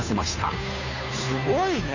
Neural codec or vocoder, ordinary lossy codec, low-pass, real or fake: codec, 16 kHz, 2 kbps, FunCodec, trained on Chinese and English, 25 frames a second; none; 7.2 kHz; fake